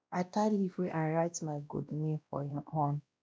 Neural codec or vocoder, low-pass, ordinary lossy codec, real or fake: codec, 16 kHz, 1 kbps, X-Codec, WavLM features, trained on Multilingual LibriSpeech; none; none; fake